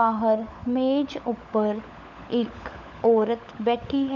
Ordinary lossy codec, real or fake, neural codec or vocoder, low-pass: none; fake; codec, 16 kHz, 16 kbps, FunCodec, trained on LibriTTS, 50 frames a second; 7.2 kHz